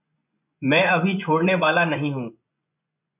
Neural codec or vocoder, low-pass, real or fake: vocoder, 24 kHz, 100 mel bands, Vocos; 3.6 kHz; fake